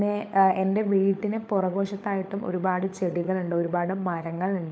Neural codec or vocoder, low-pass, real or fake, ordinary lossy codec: codec, 16 kHz, 16 kbps, FunCodec, trained on LibriTTS, 50 frames a second; none; fake; none